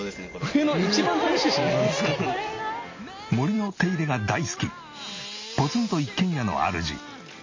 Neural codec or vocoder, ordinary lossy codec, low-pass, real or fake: none; MP3, 32 kbps; 7.2 kHz; real